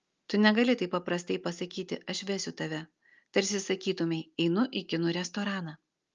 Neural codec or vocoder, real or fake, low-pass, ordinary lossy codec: none; real; 7.2 kHz; Opus, 24 kbps